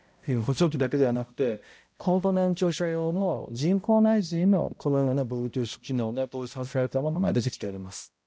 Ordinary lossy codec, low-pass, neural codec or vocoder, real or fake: none; none; codec, 16 kHz, 0.5 kbps, X-Codec, HuBERT features, trained on balanced general audio; fake